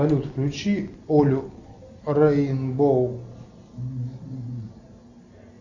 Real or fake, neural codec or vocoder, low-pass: real; none; 7.2 kHz